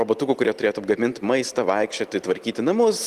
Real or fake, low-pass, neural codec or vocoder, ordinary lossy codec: real; 14.4 kHz; none; Opus, 24 kbps